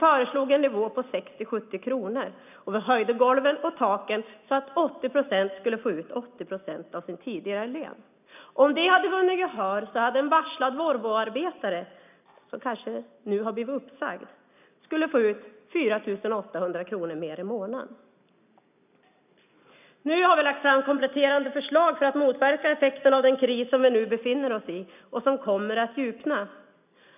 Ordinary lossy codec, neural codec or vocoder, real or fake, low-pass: none; none; real; 3.6 kHz